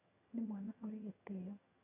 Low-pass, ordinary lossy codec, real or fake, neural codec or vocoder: 3.6 kHz; none; fake; vocoder, 22.05 kHz, 80 mel bands, HiFi-GAN